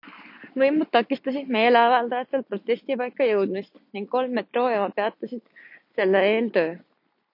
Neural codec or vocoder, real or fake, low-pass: none; real; 5.4 kHz